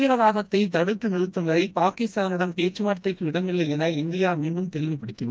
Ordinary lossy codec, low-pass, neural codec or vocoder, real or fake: none; none; codec, 16 kHz, 1 kbps, FreqCodec, smaller model; fake